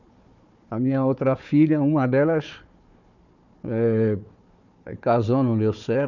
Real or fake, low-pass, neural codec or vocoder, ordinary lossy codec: fake; 7.2 kHz; codec, 16 kHz, 4 kbps, FunCodec, trained on Chinese and English, 50 frames a second; none